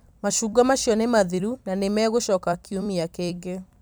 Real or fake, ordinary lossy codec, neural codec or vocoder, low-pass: fake; none; vocoder, 44.1 kHz, 128 mel bands every 256 samples, BigVGAN v2; none